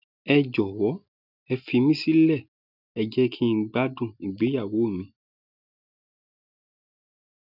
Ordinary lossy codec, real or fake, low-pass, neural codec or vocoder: none; real; 5.4 kHz; none